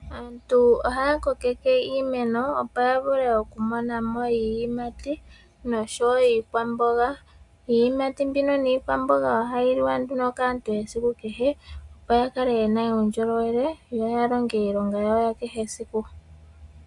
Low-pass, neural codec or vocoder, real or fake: 10.8 kHz; none; real